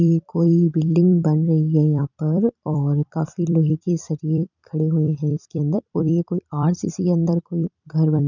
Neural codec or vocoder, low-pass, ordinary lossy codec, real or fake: vocoder, 44.1 kHz, 128 mel bands every 256 samples, BigVGAN v2; 7.2 kHz; none; fake